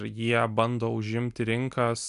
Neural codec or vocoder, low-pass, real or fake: none; 10.8 kHz; real